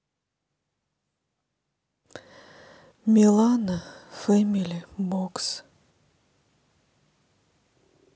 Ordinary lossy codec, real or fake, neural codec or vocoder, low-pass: none; real; none; none